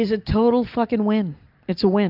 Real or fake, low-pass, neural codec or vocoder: real; 5.4 kHz; none